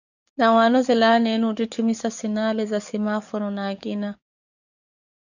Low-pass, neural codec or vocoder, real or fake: 7.2 kHz; codec, 44.1 kHz, 7.8 kbps, DAC; fake